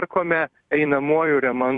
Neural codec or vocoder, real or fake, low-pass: vocoder, 44.1 kHz, 128 mel bands every 256 samples, BigVGAN v2; fake; 10.8 kHz